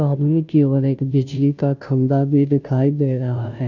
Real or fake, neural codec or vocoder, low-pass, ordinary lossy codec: fake; codec, 16 kHz, 0.5 kbps, FunCodec, trained on Chinese and English, 25 frames a second; 7.2 kHz; none